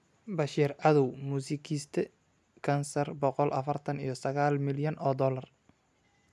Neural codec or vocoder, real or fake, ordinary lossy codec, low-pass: none; real; none; none